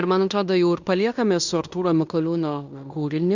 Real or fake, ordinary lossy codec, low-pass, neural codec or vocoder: fake; Opus, 64 kbps; 7.2 kHz; codec, 16 kHz in and 24 kHz out, 0.9 kbps, LongCat-Audio-Codec, fine tuned four codebook decoder